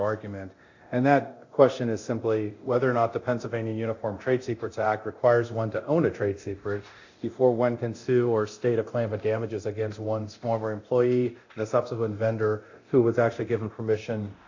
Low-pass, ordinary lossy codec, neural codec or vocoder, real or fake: 7.2 kHz; AAC, 48 kbps; codec, 24 kHz, 0.9 kbps, DualCodec; fake